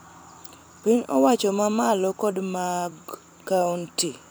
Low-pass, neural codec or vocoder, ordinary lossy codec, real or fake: none; vocoder, 44.1 kHz, 128 mel bands every 256 samples, BigVGAN v2; none; fake